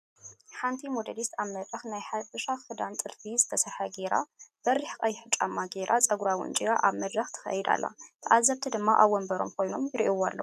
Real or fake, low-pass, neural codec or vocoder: real; 14.4 kHz; none